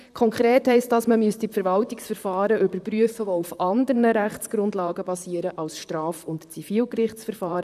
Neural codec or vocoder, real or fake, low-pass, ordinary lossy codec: vocoder, 44.1 kHz, 128 mel bands, Pupu-Vocoder; fake; 14.4 kHz; none